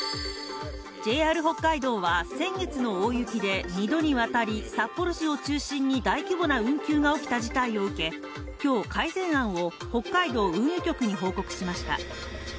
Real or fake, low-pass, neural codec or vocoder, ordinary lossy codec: real; none; none; none